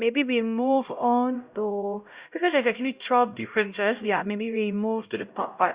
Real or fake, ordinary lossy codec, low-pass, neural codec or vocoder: fake; Opus, 64 kbps; 3.6 kHz; codec, 16 kHz, 0.5 kbps, X-Codec, HuBERT features, trained on LibriSpeech